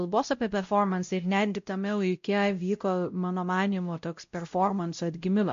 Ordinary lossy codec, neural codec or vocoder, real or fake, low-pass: MP3, 48 kbps; codec, 16 kHz, 0.5 kbps, X-Codec, WavLM features, trained on Multilingual LibriSpeech; fake; 7.2 kHz